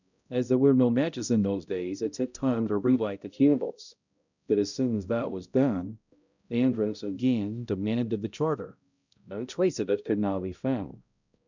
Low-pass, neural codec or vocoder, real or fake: 7.2 kHz; codec, 16 kHz, 0.5 kbps, X-Codec, HuBERT features, trained on balanced general audio; fake